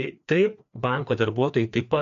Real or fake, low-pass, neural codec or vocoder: fake; 7.2 kHz; codec, 16 kHz, 2 kbps, FreqCodec, larger model